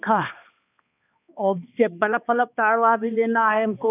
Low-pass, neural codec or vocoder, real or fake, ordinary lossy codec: 3.6 kHz; codec, 16 kHz, 2 kbps, X-Codec, HuBERT features, trained on balanced general audio; fake; none